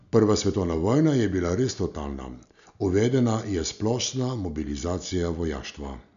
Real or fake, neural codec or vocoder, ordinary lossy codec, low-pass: real; none; none; 7.2 kHz